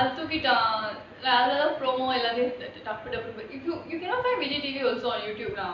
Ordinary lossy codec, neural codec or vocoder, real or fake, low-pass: AAC, 48 kbps; none; real; 7.2 kHz